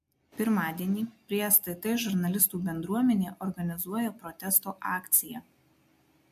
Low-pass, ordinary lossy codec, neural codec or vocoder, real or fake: 14.4 kHz; MP3, 64 kbps; none; real